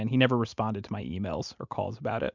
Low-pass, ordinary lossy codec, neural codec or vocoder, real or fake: 7.2 kHz; MP3, 64 kbps; none; real